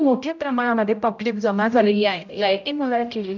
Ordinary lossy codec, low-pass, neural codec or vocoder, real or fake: none; 7.2 kHz; codec, 16 kHz, 0.5 kbps, X-Codec, HuBERT features, trained on general audio; fake